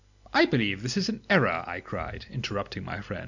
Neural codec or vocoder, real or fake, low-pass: none; real; 7.2 kHz